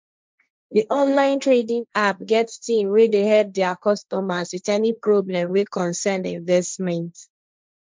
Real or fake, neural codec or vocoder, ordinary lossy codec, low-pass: fake; codec, 16 kHz, 1.1 kbps, Voila-Tokenizer; none; none